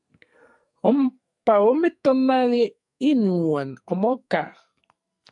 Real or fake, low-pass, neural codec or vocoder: fake; 10.8 kHz; codec, 44.1 kHz, 2.6 kbps, SNAC